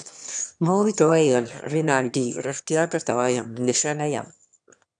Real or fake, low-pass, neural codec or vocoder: fake; 9.9 kHz; autoencoder, 22.05 kHz, a latent of 192 numbers a frame, VITS, trained on one speaker